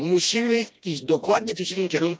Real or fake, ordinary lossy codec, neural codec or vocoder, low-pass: fake; none; codec, 16 kHz, 1 kbps, FreqCodec, smaller model; none